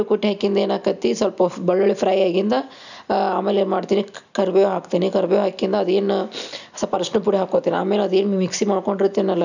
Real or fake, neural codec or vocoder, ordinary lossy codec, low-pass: real; none; none; 7.2 kHz